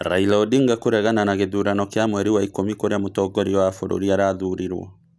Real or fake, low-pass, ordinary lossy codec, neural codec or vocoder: real; none; none; none